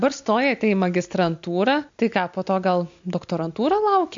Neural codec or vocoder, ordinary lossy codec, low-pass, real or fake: none; MP3, 64 kbps; 7.2 kHz; real